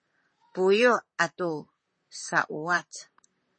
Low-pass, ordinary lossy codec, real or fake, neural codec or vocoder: 9.9 kHz; MP3, 32 kbps; fake; vocoder, 44.1 kHz, 128 mel bands, Pupu-Vocoder